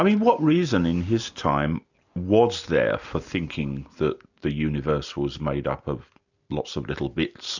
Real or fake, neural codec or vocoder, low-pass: real; none; 7.2 kHz